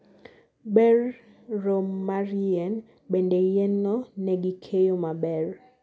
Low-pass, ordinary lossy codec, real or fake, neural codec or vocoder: none; none; real; none